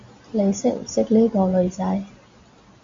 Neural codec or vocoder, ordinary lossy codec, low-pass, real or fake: none; MP3, 48 kbps; 7.2 kHz; real